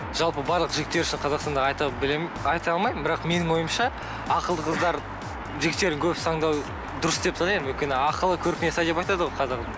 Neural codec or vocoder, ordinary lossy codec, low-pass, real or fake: none; none; none; real